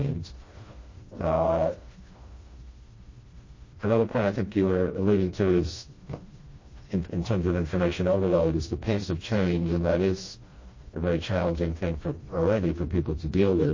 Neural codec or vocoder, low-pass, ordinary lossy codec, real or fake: codec, 16 kHz, 1 kbps, FreqCodec, smaller model; 7.2 kHz; MP3, 32 kbps; fake